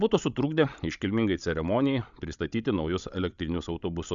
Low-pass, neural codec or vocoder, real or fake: 7.2 kHz; codec, 16 kHz, 16 kbps, FreqCodec, larger model; fake